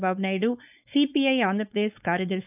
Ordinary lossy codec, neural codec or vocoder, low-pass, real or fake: none; codec, 16 kHz, 4.8 kbps, FACodec; 3.6 kHz; fake